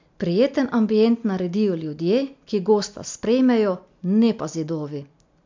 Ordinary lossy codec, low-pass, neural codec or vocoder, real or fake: MP3, 64 kbps; 7.2 kHz; none; real